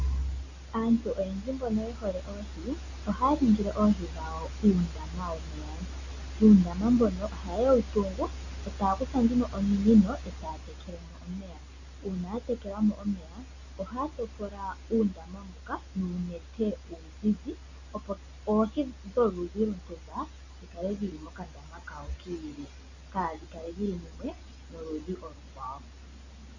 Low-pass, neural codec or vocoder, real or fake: 7.2 kHz; none; real